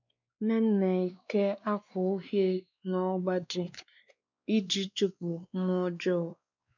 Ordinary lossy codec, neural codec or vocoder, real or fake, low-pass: none; codec, 16 kHz, 2 kbps, X-Codec, WavLM features, trained on Multilingual LibriSpeech; fake; 7.2 kHz